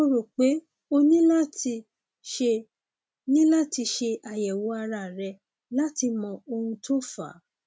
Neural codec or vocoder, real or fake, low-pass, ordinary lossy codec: none; real; none; none